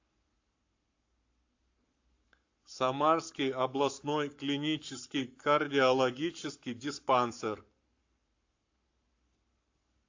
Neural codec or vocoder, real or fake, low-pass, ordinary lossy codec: codec, 44.1 kHz, 7.8 kbps, Pupu-Codec; fake; 7.2 kHz; AAC, 48 kbps